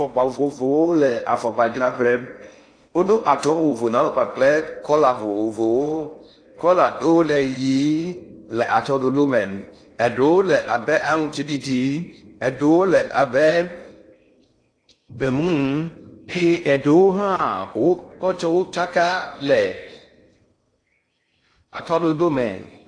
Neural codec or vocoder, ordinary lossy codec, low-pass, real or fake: codec, 16 kHz in and 24 kHz out, 0.6 kbps, FocalCodec, streaming, 4096 codes; AAC, 48 kbps; 9.9 kHz; fake